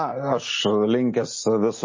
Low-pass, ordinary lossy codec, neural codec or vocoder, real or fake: 7.2 kHz; MP3, 32 kbps; none; real